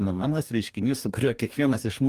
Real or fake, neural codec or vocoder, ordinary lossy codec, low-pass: fake; codec, 44.1 kHz, 2.6 kbps, DAC; Opus, 32 kbps; 14.4 kHz